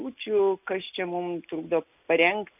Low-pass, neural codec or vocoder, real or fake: 3.6 kHz; none; real